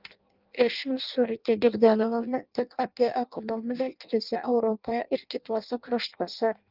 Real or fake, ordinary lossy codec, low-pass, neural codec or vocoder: fake; Opus, 24 kbps; 5.4 kHz; codec, 16 kHz in and 24 kHz out, 0.6 kbps, FireRedTTS-2 codec